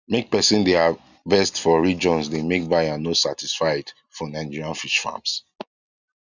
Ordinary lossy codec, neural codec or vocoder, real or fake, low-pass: none; none; real; 7.2 kHz